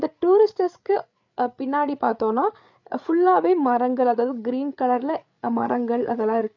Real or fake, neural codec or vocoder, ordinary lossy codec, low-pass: fake; codec, 16 kHz, 8 kbps, FreqCodec, larger model; none; 7.2 kHz